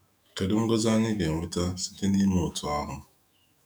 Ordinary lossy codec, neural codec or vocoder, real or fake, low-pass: none; autoencoder, 48 kHz, 128 numbers a frame, DAC-VAE, trained on Japanese speech; fake; 19.8 kHz